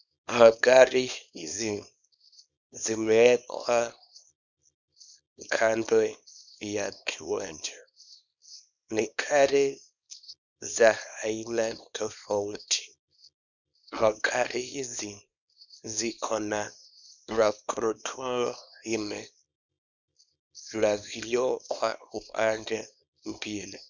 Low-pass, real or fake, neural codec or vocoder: 7.2 kHz; fake; codec, 24 kHz, 0.9 kbps, WavTokenizer, small release